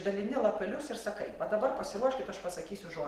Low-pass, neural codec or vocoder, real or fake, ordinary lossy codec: 14.4 kHz; none; real; Opus, 16 kbps